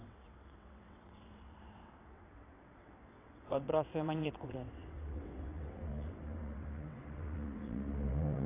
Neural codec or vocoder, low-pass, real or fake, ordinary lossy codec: vocoder, 22.05 kHz, 80 mel bands, WaveNeXt; 3.6 kHz; fake; AAC, 16 kbps